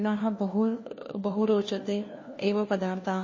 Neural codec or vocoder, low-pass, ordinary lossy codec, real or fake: codec, 16 kHz, 1 kbps, FunCodec, trained on LibriTTS, 50 frames a second; 7.2 kHz; MP3, 32 kbps; fake